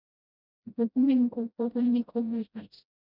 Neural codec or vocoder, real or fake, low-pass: codec, 16 kHz, 0.5 kbps, FreqCodec, smaller model; fake; 5.4 kHz